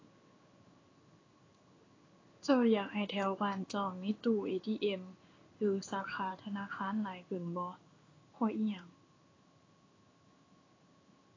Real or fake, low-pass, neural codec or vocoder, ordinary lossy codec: fake; 7.2 kHz; codec, 16 kHz in and 24 kHz out, 1 kbps, XY-Tokenizer; AAC, 32 kbps